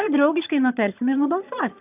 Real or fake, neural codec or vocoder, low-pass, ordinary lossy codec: fake; vocoder, 22.05 kHz, 80 mel bands, HiFi-GAN; 3.6 kHz; AAC, 32 kbps